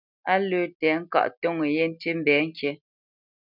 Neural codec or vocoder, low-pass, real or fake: none; 5.4 kHz; real